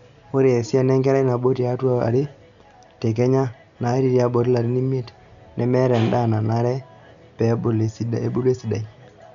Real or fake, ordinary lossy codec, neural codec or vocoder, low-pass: real; none; none; 7.2 kHz